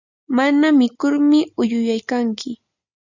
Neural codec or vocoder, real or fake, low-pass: none; real; 7.2 kHz